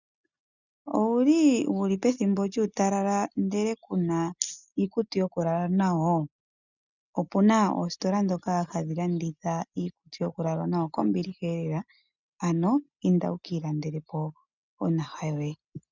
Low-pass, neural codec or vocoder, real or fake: 7.2 kHz; none; real